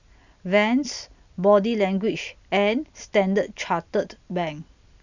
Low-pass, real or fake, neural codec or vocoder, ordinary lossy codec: 7.2 kHz; real; none; none